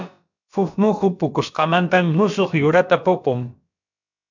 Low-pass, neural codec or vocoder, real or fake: 7.2 kHz; codec, 16 kHz, about 1 kbps, DyCAST, with the encoder's durations; fake